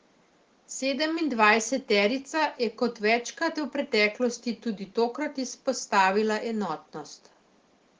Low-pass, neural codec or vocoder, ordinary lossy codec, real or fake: 7.2 kHz; none; Opus, 16 kbps; real